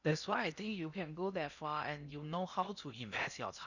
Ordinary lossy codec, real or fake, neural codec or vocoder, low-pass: none; fake; codec, 16 kHz in and 24 kHz out, 0.8 kbps, FocalCodec, streaming, 65536 codes; 7.2 kHz